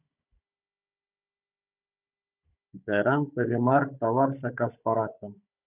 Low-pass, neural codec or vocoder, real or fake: 3.6 kHz; codec, 16 kHz, 16 kbps, FunCodec, trained on Chinese and English, 50 frames a second; fake